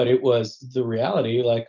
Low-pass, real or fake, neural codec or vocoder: 7.2 kHz; real; none